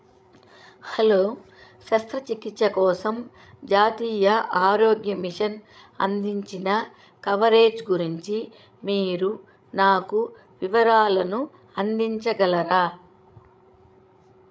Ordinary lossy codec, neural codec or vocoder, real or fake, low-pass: none; codec, 16 kHz, 16 kbps, FreqCodec, larger model; fake; none